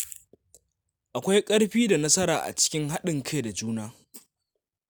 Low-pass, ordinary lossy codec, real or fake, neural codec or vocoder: none; none; real; none